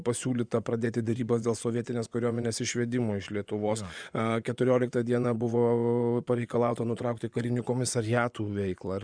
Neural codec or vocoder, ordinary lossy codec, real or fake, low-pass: vocoder, 24 kHz, 100 mel bands, Vocos; Opus, 64 kbps; fake; 9.9 kHz